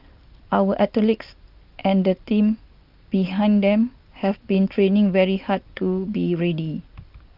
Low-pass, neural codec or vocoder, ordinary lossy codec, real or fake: 5.4 kHz; none; Opus, 24 kbps; real